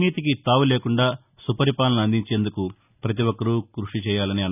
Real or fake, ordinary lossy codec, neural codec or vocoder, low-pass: real; none; none; 3.6 kHz